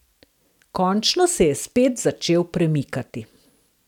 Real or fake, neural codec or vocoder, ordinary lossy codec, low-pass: real; none; none; 19.8 kHz